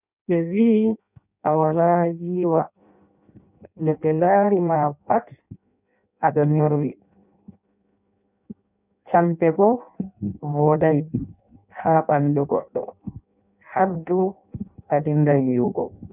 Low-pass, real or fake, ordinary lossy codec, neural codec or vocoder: 3.6 kHz; fake; none; codec, 16 kHz in and 24 kHz out, 0.6 kbps, FireRedTTS-2 codec